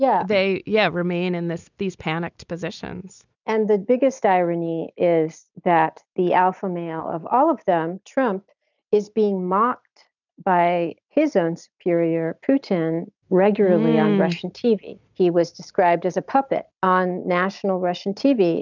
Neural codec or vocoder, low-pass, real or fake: none; 7.2 kHz; real